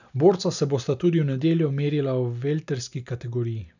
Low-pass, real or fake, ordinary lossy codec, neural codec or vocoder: 7.2 kHz; real; none; none